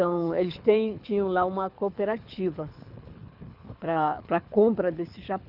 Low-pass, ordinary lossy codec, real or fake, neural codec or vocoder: 5.4 kHz; none; fake; codec, 24 kHz, 6 kbps, HILCodec